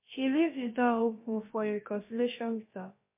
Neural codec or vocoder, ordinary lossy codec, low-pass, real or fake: codec, 16 kHz, about 1 kbps, DyCAST, with the encoder's durations; MP3, 24 kbps; 3.6 kHz; fake